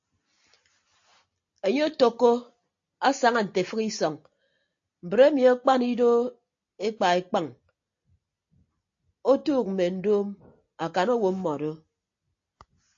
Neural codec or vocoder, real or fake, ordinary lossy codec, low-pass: none; real; AAC, 48 kbps; 7.2 kHz